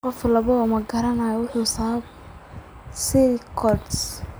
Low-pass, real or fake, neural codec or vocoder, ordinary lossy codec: none; real; none; none